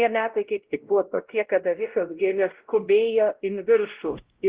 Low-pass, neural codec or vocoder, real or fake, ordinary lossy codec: 3.6 kHz; codec, 16 kHz, 0.5 kbps, X-Codec, WavLM features, trained on Multilingual LibriSpeech; fake; Opus, 16 kbps